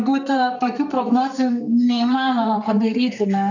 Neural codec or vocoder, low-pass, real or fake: codec, 32 kHz, 1.9 kbps, SNAC; 7.2 kHz; fake